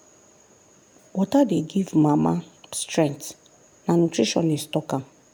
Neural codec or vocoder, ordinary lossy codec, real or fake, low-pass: none; none; real; none